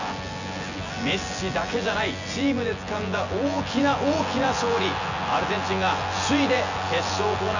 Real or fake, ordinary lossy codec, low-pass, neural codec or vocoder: fake; none; 7.2 kHz; vocoder, 24 kHz, 100 mel bands, Vocos